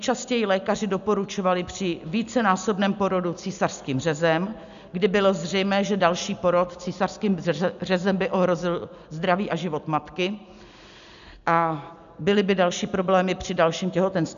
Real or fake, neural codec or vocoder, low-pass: real; none; 7.2 kHz